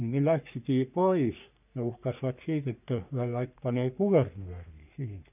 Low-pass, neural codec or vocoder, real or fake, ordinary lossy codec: 3.6 kHz; codec, 44.1 kHz, 3.4 kbps, Pupu-Codec; fake; none